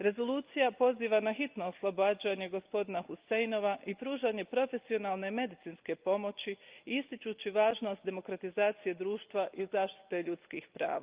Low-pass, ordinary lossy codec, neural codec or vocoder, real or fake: 3.6 kHz; Opus, 32 kbps; none; real